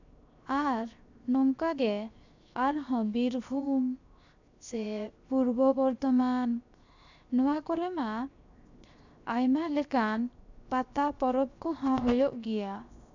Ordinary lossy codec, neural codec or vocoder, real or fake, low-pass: none; codec, 16 kHz, 0.7 kbps, FocalCodec; fake; 7.2 kHz